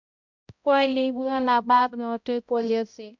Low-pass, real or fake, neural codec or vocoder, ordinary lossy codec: 7.2 kHz; fake; codec, 16 kHz, 0.5 kbps, X-Codec, HuBERT features, trained on balanced general audio; MP3, 64 kbps